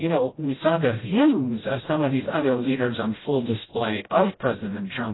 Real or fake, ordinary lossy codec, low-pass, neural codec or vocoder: fake; AAC, 16 kbps; 7.2 kHz; codec, 16 kHz, 0.5 kbps, FreqCodec, smaller model